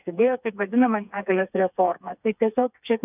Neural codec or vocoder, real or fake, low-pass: codec, 16 kHz, 4 kbps, FreqCodec, smaller model; fake; 3.6 kHz